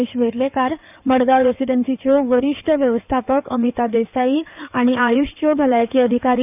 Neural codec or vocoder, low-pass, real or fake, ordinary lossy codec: codec, 16 kHz in and 24 kHz out, 2.2 kbps, FireRedTTS-2 codec; 3.6 kHz; fake; none